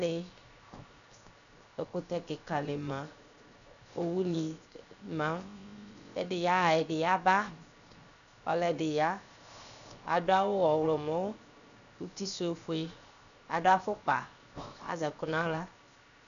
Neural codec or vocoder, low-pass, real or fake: codec, 16 kHz, 0.7 kbps, FocalCodec; 7.2 kHz; fake